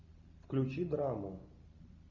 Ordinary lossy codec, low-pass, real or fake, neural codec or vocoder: Opus, 64 kbps; 7.2 kHz; real; none